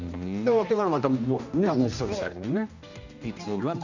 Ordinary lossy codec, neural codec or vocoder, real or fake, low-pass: none; codec, 16 kHz, 1 kbps, X-Codec, HuBERT features, trained on general audio; fake; 7.2 kHz